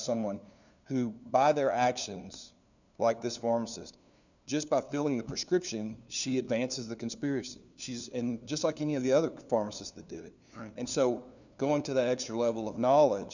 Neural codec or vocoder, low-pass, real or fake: codec, 16 kHz, 2 kbps, FunCodec, trained on LibriTTS, 25 frames a second; 7.2 kHz; fake